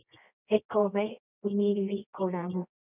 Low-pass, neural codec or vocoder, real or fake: 3.6 kHz; codec, 24 kHz, 0.9 kbps, WavTokenizer, medium music audio release; fake